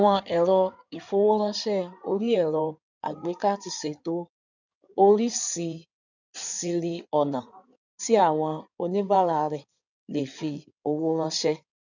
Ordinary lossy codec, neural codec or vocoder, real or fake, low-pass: none; codec, 16 kHz in and 24 kHz out, 2.2 kbps, FireRedTTS-2 codec; fake; 7.2 kHz